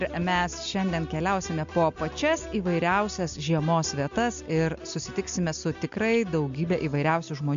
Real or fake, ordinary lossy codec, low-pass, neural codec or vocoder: real; MP3, 64 kbps; 7.2 kHz; none